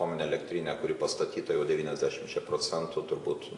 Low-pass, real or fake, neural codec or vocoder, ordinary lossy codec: 10.8 kHz; real; none; AAC, 48 kbps